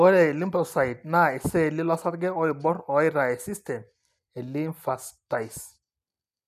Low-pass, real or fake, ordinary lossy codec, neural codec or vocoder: 14.4 kHz; real; none; none